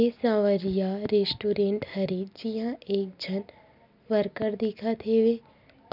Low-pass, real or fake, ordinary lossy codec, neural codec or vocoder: 5.4 kHz; real; none; none